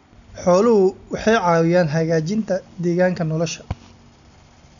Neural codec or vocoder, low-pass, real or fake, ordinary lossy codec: none; 7.2 kHz; real; none